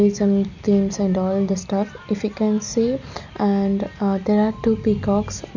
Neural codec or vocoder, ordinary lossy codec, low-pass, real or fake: none; none; 7.2 kHz; real